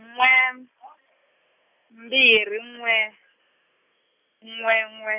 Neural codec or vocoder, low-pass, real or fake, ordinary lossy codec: none; 3.6 kHz; real; none